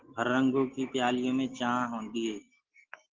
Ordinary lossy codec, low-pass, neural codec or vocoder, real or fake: Opus, 16 kbps; 7.2 kHz; none; real